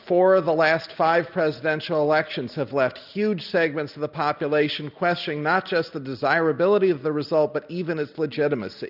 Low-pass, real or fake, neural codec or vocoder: 5.4 kHz; real; none